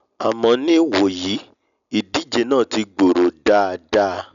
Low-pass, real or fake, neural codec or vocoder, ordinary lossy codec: 7.2 kHz; real; none; none